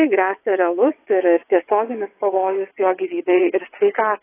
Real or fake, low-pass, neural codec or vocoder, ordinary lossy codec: fake; 3.6 kHz; vocoder, 22.05 kHz, 80 mel bands, WaveNeXt; AAC, 16 kbps